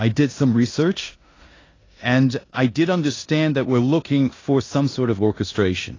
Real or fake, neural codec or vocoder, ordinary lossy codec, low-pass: fake; codec, 16 kHz in and 24 kHz out, 0.9 kbps, LongCat-Audio-Codec, four codebook decoder; AAC, 32 kbps; 7.2 kHz